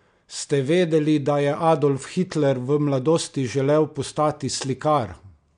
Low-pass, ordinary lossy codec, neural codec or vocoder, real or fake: 9.9 kHz; MP3, 64 kbps; none; real